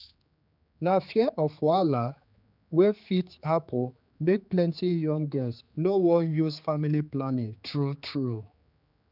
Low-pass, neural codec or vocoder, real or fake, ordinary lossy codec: 5.4 kHz; codec, 16 kHz, 4 kbps, X-Codec, HuBERT features, trained on general audio; fake; none